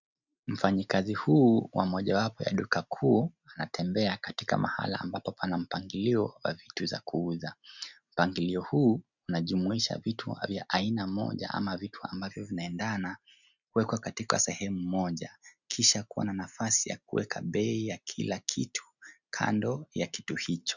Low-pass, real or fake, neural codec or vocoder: 7.2 kHz; real; none